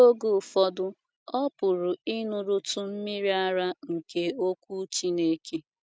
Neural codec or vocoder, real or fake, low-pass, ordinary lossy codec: none; real; none; none